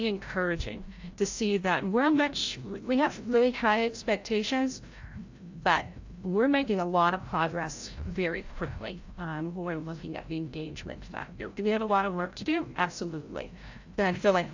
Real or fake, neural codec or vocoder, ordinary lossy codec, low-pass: fake; codec, 16 kHz, 0.5 kbps, FreqCodec, larger model; AAC, 48 kbps; 7.2 kHz